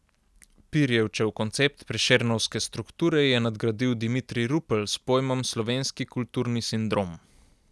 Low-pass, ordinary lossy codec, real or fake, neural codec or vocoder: none; none; real; none